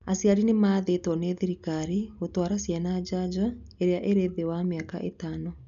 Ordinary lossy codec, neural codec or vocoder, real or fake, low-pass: none; none; real; 7.2 kHz